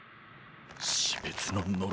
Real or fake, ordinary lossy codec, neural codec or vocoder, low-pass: real; none; none; none